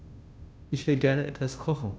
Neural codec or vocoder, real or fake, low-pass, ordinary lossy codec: codec, 16 kHz, 0.5 kbps, FunCodec, trained on Chinese and English, 25 frames a second; fake; none; none